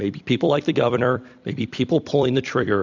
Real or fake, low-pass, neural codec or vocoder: fake; 7.2 kHz; vocoder, 44.1 kHz, 128 mel bands every 256 samples, BigVGAN v2